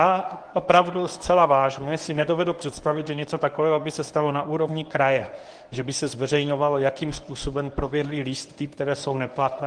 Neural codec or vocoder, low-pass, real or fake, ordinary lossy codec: codec, 24 kHz, 0.9 kbps, WavTokenizer, medium speech release version 1; 9.9 kHz; fake; Opus, 24 kbps